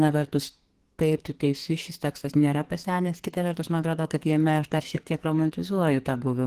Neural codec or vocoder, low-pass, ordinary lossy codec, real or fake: codec, 44.1 kHz, 2.6 kbps, SNAC; 14.4 kHz; Opus, 16 kbps; fake